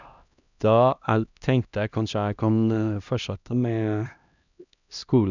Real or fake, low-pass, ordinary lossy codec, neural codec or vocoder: fake; 7.2 kHz; none; codec, 16 kHz, 1 kbps, X-Codec, HuBERT features, trained on LibriSpeech